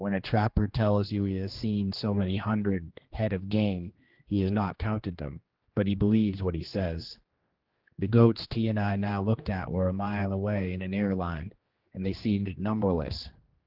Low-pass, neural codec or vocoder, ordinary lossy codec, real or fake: 5.4 kHz; codec, 16 kHz, 2 kbps, X-Codec, HuBERT features, trained on general audio; Opus, 16 kbps; fake